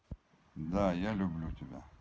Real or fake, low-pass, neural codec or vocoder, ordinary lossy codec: real; none; none; none